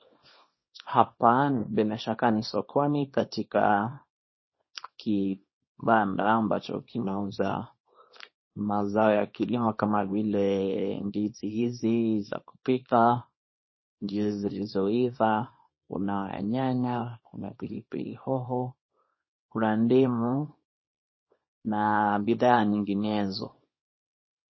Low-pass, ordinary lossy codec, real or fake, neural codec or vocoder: 7.2 kHz; MP3, 24 kbps; fake; codec, 24 kHz, 0.9 kbps, WavTokenizer, small release